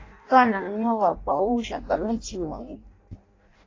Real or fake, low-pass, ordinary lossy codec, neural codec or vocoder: fake; 7.2 kHz; AAC, 32 kbps; codec, 16 kHz in and 24 kHz out, 0.6 kbps, FireRedTTS-2 codec